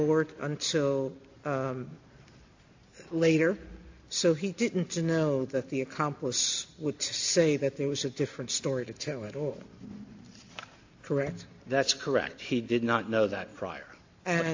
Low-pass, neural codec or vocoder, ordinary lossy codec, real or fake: 7.2 kHz; none; AAC, 48 kbps; real